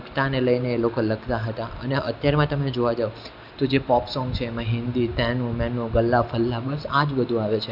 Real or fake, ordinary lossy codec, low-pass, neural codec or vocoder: real; none; 5.4 kHz; none